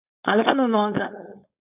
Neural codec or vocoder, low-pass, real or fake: codec, 16 kHz, 4.8 kbps, FACodec; 3.6 kHz; fake